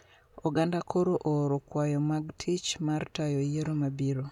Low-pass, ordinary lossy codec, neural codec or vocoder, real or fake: 19.8 kHz; none; none; real